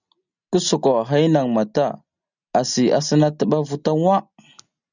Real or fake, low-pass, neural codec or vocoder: real; 7.2 kHz; none